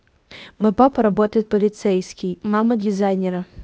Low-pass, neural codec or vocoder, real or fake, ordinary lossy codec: none; codec, 16 kHz, 0.8 kbps, ZipCodec; fake; none